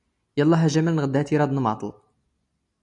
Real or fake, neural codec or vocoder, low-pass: real; none; 10.8 kHz